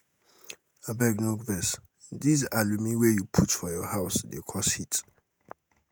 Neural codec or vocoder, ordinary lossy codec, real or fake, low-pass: vocoder, 48 kHz, 128 mel bands, Vocos; none; fake; none